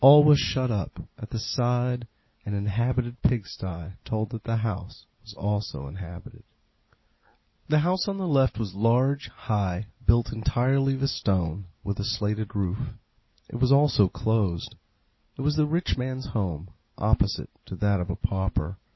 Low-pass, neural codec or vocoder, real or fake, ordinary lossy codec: 7.2 kHz; none; real; MP3, 24 kbps